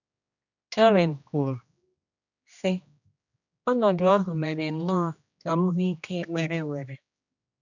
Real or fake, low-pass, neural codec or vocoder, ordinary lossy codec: fake; 7.2 kHz; codec, 16 kHz, 1 kbps, X-Codec, HuBERT features, trained on general audio; none